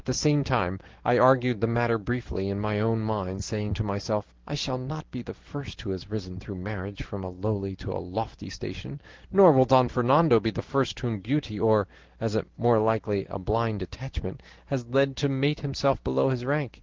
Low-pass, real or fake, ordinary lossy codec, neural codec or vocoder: 7.2 kHz; real; Opus, 16 kbps; none